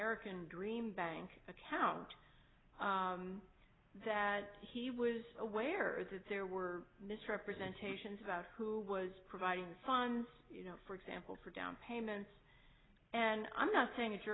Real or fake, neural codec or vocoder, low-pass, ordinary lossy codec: real; none; 7.2 kHz; AAC, 16 kbps